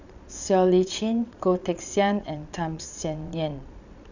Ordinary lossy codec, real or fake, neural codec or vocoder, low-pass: none; fake; vocoder, 22.05 kHz, 80 mel bands, Vocos; 7.2 kHz